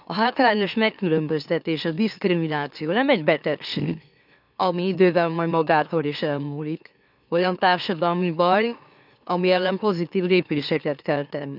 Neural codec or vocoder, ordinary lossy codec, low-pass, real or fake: autoencoder, 44.1 kHz, a latent of 192 numbers a frame, MeloTTS; none; 5.4 kHz; fake